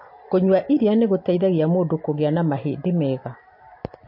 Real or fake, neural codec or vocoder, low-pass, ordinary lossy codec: real; none; 5.4 kHz; MP3, 32 kbps